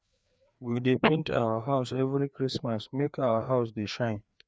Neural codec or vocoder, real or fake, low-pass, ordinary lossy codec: codec, 16 kHz, 2 kbps, FreqCodec, larger model; fake; none; none